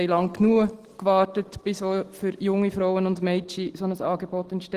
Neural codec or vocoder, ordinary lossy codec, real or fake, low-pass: none; Opus, 16 kbps; real; 14.4 kHz